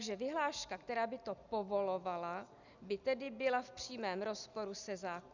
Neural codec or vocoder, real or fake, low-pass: none; real; 7.2 kHz